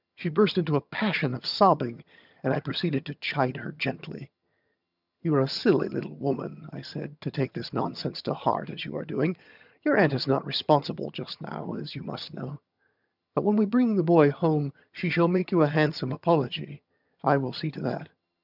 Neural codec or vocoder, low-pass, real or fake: vocoder, 22.05 kHz, 80 mel bands, HiFi-GAN; 5.4 kHz; fake